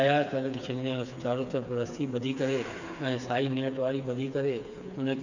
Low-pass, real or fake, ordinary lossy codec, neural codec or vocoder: 7.2 kHz; fake; none; codec, 16 kHz, 4 kbps, FreqCodec, smaller model